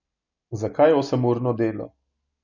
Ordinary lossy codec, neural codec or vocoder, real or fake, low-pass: none; none; real; 7.2 kHz